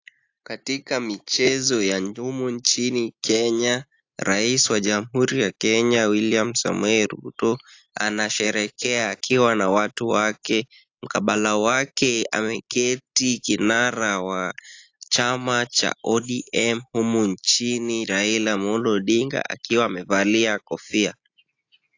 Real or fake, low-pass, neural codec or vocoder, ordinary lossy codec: real; 7.2 kHz; none; AAC, 48 kbps